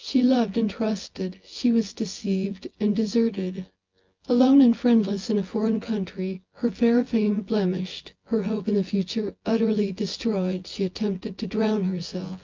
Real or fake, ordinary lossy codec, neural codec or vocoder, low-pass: fake; Opus, 24 kbps; vocoder, 24 kHz, 100 mel bands, Vocos; 7.2 kHz